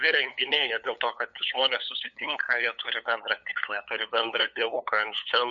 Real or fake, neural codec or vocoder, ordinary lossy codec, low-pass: fake; codec, 16 kHz, 8 kbps, FunCodec, trained on LibriTTS, 25 frames a second; MP3, 48 kbps; 7.2 kHz